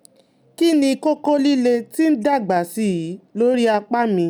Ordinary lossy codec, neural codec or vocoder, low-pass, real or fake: none; none; none; real